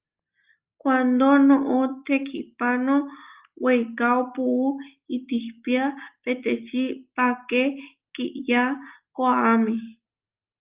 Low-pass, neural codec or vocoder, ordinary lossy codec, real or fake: 3.6 kHz; none; Opus, 32 kbps; real